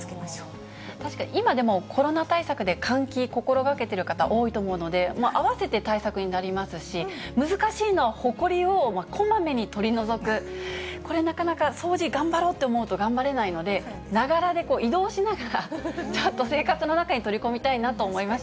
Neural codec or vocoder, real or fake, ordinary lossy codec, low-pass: none; real; none; none